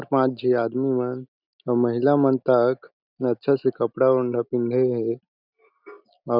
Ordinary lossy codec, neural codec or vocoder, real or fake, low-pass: none; none; real; 5.4 kHz